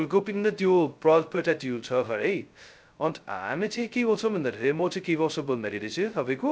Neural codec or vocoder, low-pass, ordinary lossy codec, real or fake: codec, 16 kHz, 0.2 kbps, FocalCodec; none; none; fake